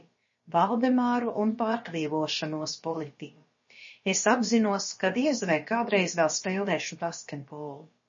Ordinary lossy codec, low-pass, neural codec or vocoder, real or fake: MP3, 32 kbps; 7.2 kHz; codec, 16 kHz, about 1 kbps, DyCAST, with the encoder's durations; fake